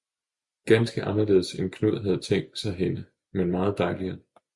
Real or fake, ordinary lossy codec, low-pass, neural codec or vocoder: real; Opus, 64 kbps; 10.8 kHz; none